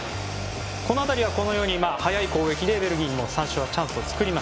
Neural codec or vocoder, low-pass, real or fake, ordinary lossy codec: none; none; real; none